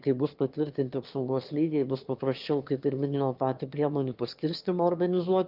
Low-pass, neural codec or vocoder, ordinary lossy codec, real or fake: 5.4 kHz; autoencoder, 22.05 kHz, a latent of 192 numbers a frame, VITS, trained on one speaker; Opus, 32 kbps; fake